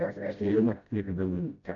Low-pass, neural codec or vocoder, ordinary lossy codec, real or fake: 7.2 kHz; codec, 16 kHz, 0.5 kbps, FreqCodec, smaller model; AAC, 48 kbps; fake